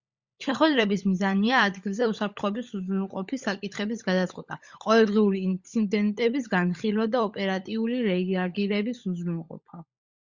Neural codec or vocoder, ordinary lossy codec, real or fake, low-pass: codec, 16 kHz, 16 kbps, FunCodec, trained on LibriTTS, 50 frames a second; Opus, 64 kbps; fake; 7.2 kHz